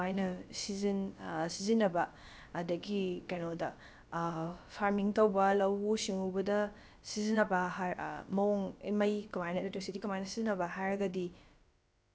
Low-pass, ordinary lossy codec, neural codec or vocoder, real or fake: none; none; codec, 16 kHz, about 1 kbps, DyCAST, with the encoder's durations; fake